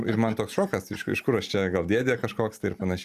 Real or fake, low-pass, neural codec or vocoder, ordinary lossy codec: real; 14.4 kHz; none; Opus, 64 kbps